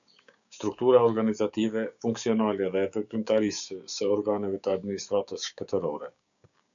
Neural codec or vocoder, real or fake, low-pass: codec, 16 kHz, 6 kbps, DAC; fake; 7.2 kHz